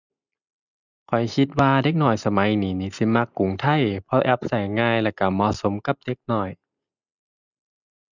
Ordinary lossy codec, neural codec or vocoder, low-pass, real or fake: none; none; 7.2 kHz; real